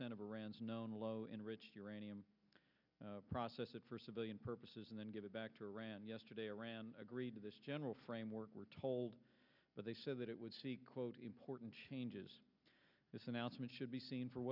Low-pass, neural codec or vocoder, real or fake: 5.4 kHz; none; real